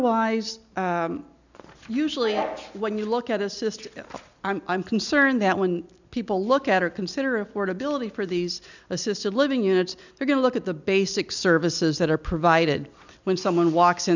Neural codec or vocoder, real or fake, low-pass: none; real; 7.2 kHz